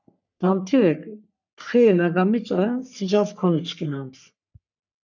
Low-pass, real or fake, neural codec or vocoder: 7.2 kHz; fake; codec, 44.1 kHz, 3.4 kbps, Pupu-Codec